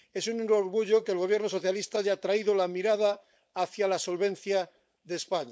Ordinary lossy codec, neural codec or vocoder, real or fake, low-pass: none; codec, 16 kHz, 4.8 kbps, FACodec; fake; none